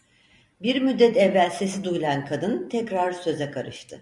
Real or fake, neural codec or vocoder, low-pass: fake; vocoder, 44.1 kHz, 128 mel bands every 256 samples, BigVGAN v2; 10.8 kHz